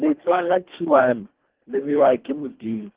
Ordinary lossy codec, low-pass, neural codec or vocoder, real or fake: Opus, 16 kbps; 3.6 kHz; codec, 24 kHz, 1.5 kbps, HILCodec; fake